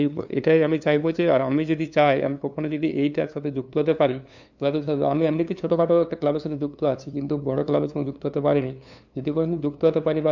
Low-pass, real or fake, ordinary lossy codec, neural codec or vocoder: 7.2 kHz; fake; none; codec, 16 kHz, 2 kbps, FunCodec, trained on LibriTTS, 25 frames a second